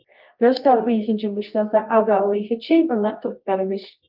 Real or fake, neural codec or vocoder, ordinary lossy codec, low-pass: fake; codec, 24 kHz, 0.9 kbps, WavTokenizer, medium music audio release; Opus, 24 kbps; 5.4 kHz